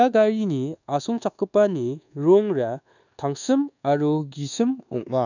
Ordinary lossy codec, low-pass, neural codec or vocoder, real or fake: none; 7.2 kHz; codec, 24 kHz, 1.2 kbps, DualCodec; fake